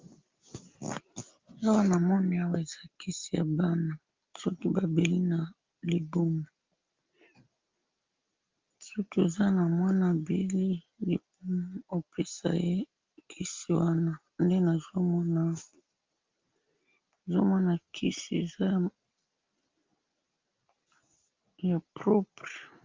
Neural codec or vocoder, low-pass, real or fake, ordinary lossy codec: none; 7.2 kHz; real; Opus, 24 kbps